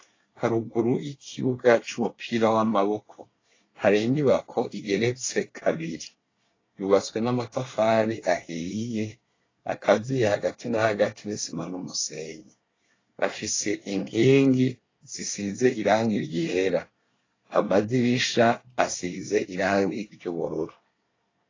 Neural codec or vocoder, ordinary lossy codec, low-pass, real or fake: codec, 24 kHz, 1 kbps, SNAC; AAC, 32 kbps; 7.2 kHz; fake